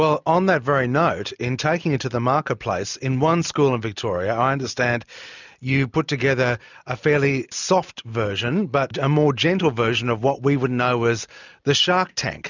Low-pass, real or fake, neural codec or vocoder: 7.2 kHz; real; none